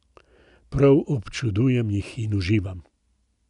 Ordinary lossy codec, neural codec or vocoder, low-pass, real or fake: none; none; 10.8 kHz; real